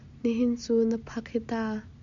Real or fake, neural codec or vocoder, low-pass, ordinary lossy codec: real; none; 7.2 kHz; MP3, 64 kbps